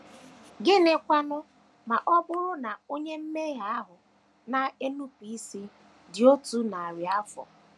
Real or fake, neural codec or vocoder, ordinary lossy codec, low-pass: real; none; none; none